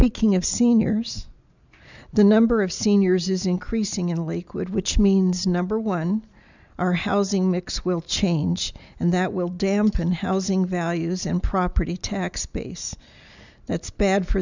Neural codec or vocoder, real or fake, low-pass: none; real; 7.2 kHz